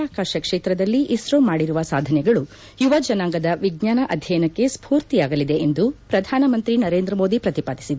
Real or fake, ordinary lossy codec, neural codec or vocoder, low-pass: real; none; none; none